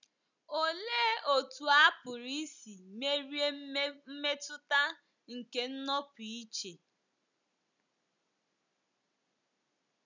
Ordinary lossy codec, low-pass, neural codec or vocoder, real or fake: none; 7.2 kHz; none; real